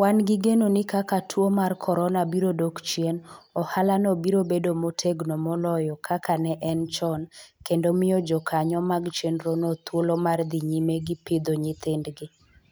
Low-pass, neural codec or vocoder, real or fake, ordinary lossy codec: none; none; real; none